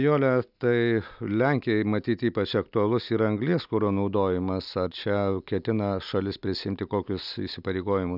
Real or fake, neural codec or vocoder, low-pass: real; none; 5.4 kHz